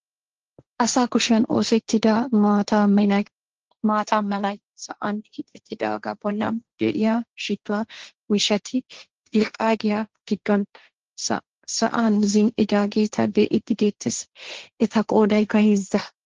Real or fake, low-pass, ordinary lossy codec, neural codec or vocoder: fake; 7.2 kHz; Opus, 16 kbps; codec, 16 kHz, 1.1 kbps, Voila-Tokenizer